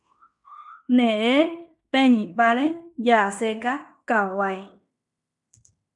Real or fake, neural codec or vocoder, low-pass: fake; codec, 16 kHz in and 24 kHz out, 0.9 kbps, LongCat-Audio-Codec, fine tuned four codebook decoder; 10.8 kHz